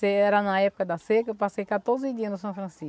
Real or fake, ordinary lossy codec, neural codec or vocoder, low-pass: real; none; none; none